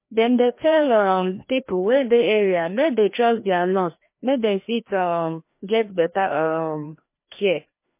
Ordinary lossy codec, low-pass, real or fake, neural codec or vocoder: MP3, 24 kbps; 3.6 kHz; fake; codec, 16 kHz, 1 kbps, FreqCodec, larger model